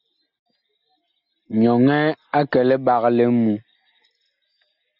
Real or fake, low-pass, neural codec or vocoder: real; 5.4 kHz; none